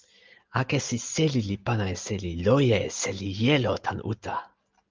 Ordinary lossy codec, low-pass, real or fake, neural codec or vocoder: Opus, 24 kbps; 7.2 kHz; real; none